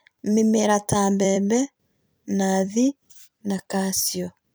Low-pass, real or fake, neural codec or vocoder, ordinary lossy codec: none; fake; vocoder, 44.1 kHz, 128 mel bands every 256 samples, BigVGAN v2; none